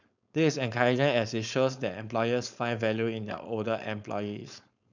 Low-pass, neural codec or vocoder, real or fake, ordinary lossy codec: 7.2 kHz; codec, 16 kHz, 4.8 kbps, FACodec; fake; none